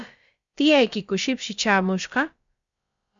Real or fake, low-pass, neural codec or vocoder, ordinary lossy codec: fake; 7.2 kHz; codec, 16 kHz, about 1 kbps, DyCAST, with the encoder's durations; Opus, 64 kbps